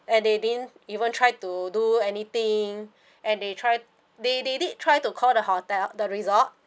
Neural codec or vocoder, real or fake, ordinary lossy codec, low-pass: none; real; none; none